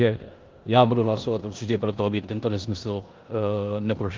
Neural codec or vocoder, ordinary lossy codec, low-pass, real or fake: codec, 16 kHz in and 24 kHz out, 0.9 kbps, LongCat-Audio-Codec, four codebook decoder; Opus, 32 kbps; 7.2 kHz; fake